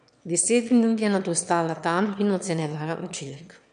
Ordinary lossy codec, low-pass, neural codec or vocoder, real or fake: none; 9.9 kHz; autoencoder, 22.05 kHz, a latent of 192 numbers a frame, VITS, trained on one speaker; fake